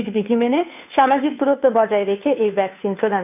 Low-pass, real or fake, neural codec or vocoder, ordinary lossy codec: 3.6 kHz; fake; codec, 16 kHz, 2 kbps, FunCodec, trained on Chinese and English, 25 frames a second; none